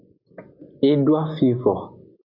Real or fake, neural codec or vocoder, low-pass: real; none; 5.4 kHz